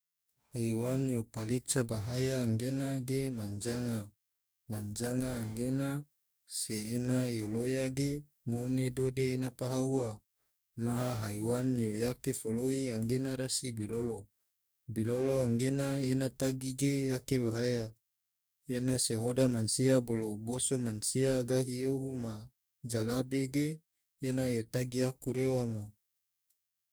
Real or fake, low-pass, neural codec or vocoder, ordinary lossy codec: fake; none; codec, 44.1 kHz, 2.6 kbps, DAC; none